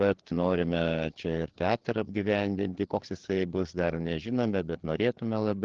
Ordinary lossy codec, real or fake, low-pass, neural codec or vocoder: Opus, 16 kbps; fake; 7.2 kHz; codec, 16 kHz, 4 kbps, FreqCodec, larger model